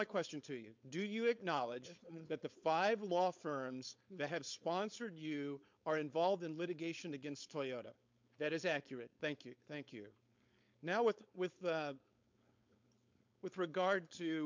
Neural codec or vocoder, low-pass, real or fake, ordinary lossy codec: codec, 16 kHz, 4.8 kbps, FACodec; 7.2 kHz; fake; MP3, 64 kbps